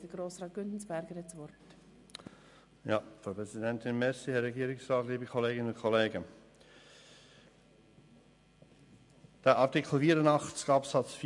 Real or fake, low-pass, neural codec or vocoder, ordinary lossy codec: real; 10.8 kHz; none; MP3, 64 kbps